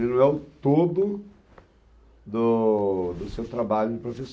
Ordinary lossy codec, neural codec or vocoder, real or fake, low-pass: none; none; real; none